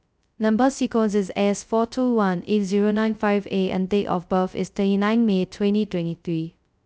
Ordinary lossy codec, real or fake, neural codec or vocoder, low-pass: none; fake; codec, 16 kHz, 0.2 kbps, FocalCodec; none